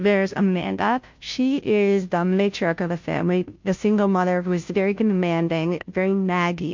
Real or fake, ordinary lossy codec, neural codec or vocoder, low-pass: fake; MP3, 48 kbps; codec, 16 kHz, 0.5 kbps, FunCodec, trained on Chinese and English, 25 frames a second; 7.2 kHz